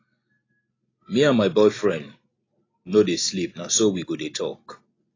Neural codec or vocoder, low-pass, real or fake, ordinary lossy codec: none; 7.2 kHz; real; AAC, 32 kbps